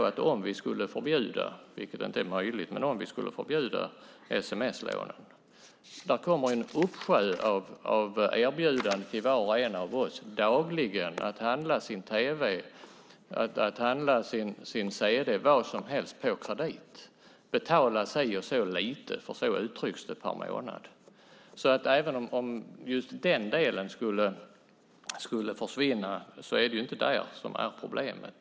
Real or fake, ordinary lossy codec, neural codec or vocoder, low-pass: real; none; none; none